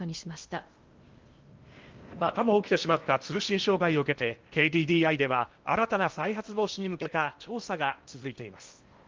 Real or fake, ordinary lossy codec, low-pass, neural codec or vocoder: fake; Opus, 32 kbps; 7.2 kHz; codec, 16 kHz in and 24 kHz out, 0.8 kbps, FocalCodec, streaming, 65536 codes